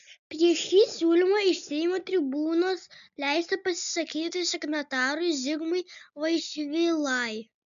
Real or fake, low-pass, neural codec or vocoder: real; 7.2 kHz; none